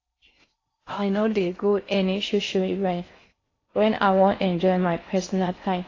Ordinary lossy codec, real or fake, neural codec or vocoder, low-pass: AAC, 32 kbps; fake; codec, 16 kHz in and 24 kHz out, 0.6 kbps, FocalCodec, streaming, 4096 codes; 7.2 kHz